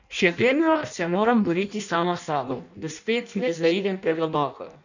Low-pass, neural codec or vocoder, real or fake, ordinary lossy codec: 7.2 kHz; codec, 16 kHz in and 24 kHz out, 0.6 kbps, FireRedTTS-2 codec; fake; none